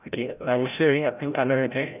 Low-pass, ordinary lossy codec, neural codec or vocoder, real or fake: 3.6 kHz; none; codec, 16 kHz, 0.5 kbps, FreqCodec, larger model; fake